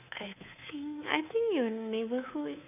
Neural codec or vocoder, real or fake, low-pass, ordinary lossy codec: codec, 24 kHz, 3.1 kbps, DualCodec; fake; 3.6 kHz; none